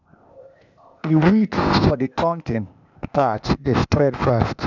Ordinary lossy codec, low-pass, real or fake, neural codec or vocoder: none; 7.2 kHz; fake; codec, 16 kHz, 0.8 kbps, ZipCodec